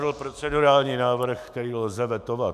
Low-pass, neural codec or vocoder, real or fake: 14.4 kHz; autoencoder, 48 kHz, 128 numbers a frame, DAC-VAE, trained on Japanese speech; fake